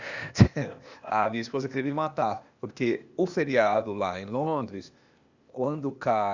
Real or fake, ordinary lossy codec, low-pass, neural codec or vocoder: fake; Opus, 64 kbps; 7.2 kHz; codec, 16 kHz, 0.8 kbps, ZipCodec